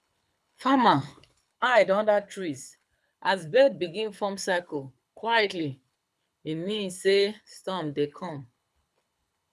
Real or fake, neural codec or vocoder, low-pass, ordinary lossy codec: fake; codec, 24 kHz, 6 kbps, HILCodec; none; none